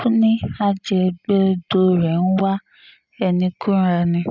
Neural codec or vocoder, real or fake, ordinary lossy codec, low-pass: none; real; none; 7.2 kHz